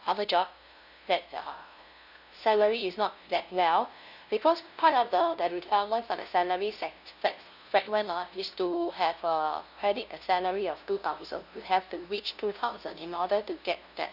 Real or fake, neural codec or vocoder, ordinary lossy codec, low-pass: fake; codec, 16 kHz, 0.5 kbps, FunCodec, trained on LibriTTS, 25 frames a second; none; 5.4 kHz